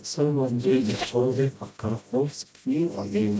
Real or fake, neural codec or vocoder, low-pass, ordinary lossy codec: fake; codec, 16 kHz, 0.5 kbps, FreqCodec, smaller model; none; none